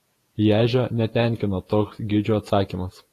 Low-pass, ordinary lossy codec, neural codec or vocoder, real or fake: 14.4 kHz; AAC, 48 kbps; vocoder, 48 kHz, 128 mel bands, Vocos; fake